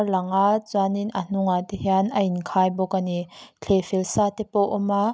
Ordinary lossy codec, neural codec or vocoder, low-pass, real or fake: none; none; none; real